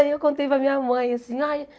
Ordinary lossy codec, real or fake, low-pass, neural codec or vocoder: none; real; none; none